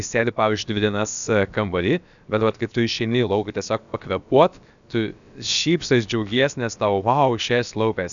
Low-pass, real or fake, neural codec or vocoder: 7.2 kHz; fake; codec, 16 kHz, about 1 kbps, DyCAST, with the encoder's durations